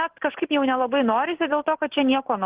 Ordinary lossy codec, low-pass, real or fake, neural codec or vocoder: Opus, 16 kbps; 3.6 kHz; real; none